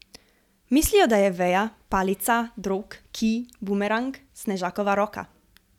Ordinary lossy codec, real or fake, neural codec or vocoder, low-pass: none; real; none; 19.8 kHz